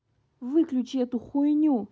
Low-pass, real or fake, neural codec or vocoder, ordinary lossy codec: none; real; none; none